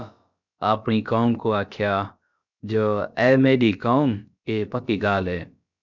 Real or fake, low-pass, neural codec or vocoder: fake; 7.2 kHz; codec, 16 kHz, about 1 kbps, DyCAST, with the encoder's durations